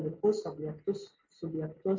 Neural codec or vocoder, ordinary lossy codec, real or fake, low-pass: none; MP3, 48 kbps; real; 7.2 kHz